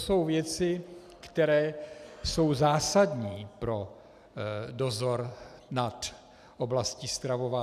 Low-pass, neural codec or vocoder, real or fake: 14.4 kHz; none; real